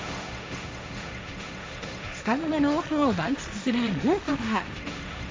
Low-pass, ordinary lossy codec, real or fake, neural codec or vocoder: none; none; fake; codec, 16 kHz, 1.1 kbps, Voila-Tokenizer